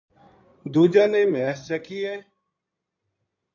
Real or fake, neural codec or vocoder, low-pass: fake; codec, 16 kHz in and 24 kHz out, 2.2 kbps, FireRedTTS-2 codec; 7.2 kHz